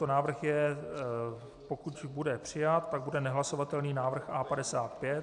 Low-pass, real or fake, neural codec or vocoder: 10.8 kHz; real; none